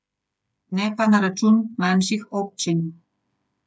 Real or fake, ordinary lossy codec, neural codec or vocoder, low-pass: fake; none; codec, 16 kHz, 8 kbps, FreqCodec, smaller model; none